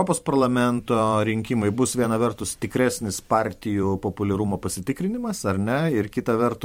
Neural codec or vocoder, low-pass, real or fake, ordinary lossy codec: vocoder, 44.1 kHz, 128 mel bands every 512 samples, BigVGAN v2; 19.8 kHz; fake; MP3, 64 kbps